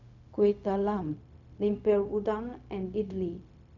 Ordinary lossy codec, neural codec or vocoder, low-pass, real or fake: none; codec, 16 kHz, 0.4 kbps, LongCat-Audio-Codec; 7.2 kHz; fake